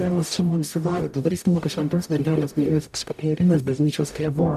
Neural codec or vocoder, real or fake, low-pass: codec, 44.1 kHz, 0.9 kbps, DAC; fake; 14.4 kHz